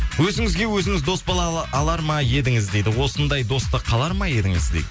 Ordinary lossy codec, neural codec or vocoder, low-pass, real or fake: none; none; none; real